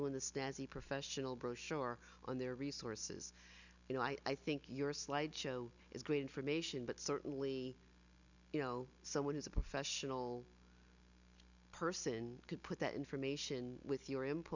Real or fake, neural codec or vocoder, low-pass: real; none; 7.2 kHz